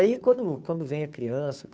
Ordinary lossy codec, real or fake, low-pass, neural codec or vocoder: none; fake; none; codec, 16 kHz, 2 kbps, FunCodec, trained on Chinese and English, 25 frames a second